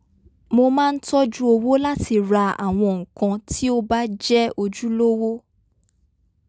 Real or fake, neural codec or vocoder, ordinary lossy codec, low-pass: real; none; none; none